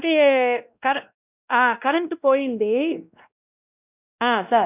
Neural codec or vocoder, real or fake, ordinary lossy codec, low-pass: codec, 16 kHz, 1 kbps, X-Codec, WavLM features, trained on Multilingual LibriSpeech; fake; none; 3.6 kHz